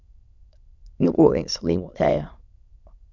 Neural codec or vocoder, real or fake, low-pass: autoencoder, 22.05 kHz, a latent of 192 numbers a frame, VITS, trained on many speakers; fake; 7.2 kHz